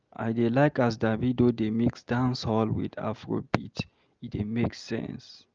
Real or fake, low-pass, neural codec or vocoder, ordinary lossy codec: real; 7.2 kHz; none; Opus, 24 kbps